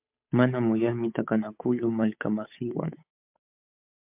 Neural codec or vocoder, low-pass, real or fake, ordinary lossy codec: codec, 16 kHz, 8 kbps, FunCodec, trained on Chinese and English, 25 frames a second; 3.6 kHz; fake; MP3, 32 kbps